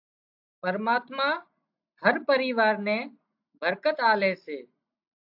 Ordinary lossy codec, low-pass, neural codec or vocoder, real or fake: AAC, 48 kbps; 5.4 kHz; none; real